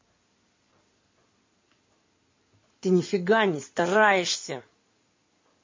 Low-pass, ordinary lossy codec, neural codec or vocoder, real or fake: 7.2 kHz; MP3, 32 kbps; codec, 16 kHz in and 24 kHz out, 2.2 kbps, FireRedTTS-2 codec; fake